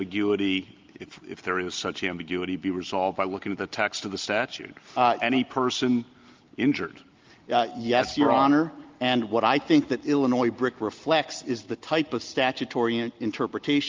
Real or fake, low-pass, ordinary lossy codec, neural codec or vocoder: real; 7.2 kHz; Opus, 32 kbps; none